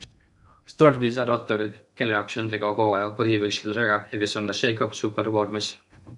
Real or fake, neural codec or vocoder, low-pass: fake; codec, 16 kHz in and 24 kHz out, 0.6 kbps, FocalCodec, streaming, 2048 codes; 10.8 kHz